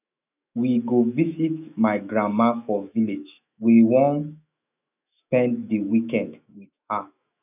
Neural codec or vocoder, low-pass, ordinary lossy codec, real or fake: autoencoder, 48 kHz, 128 numbers a frame, DAC-VAE, trained on Japanese speech; 3.6 kHz; none; fake